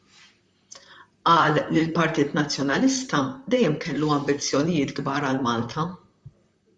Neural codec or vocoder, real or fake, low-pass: vocoder, 44.1 kHz, 128 mel bands, Pupu-Vocoder; fake; 10.8 kHz